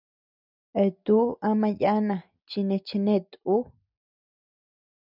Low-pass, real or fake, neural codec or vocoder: 5.4 kHz; real; none